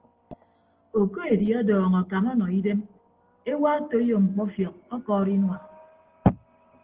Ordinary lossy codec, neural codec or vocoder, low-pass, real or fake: Opus, 16 kbps; none; 3.6 kHz; real